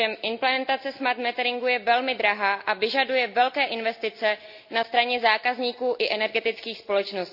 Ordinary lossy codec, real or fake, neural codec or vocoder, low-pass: none; real; none; 5.4 kHz